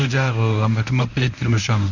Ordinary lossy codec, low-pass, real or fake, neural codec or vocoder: none; 7.2 kHz; fake; codec, 24 kHz, 0.9 kbps, WavTokenizer, medium speech release version 1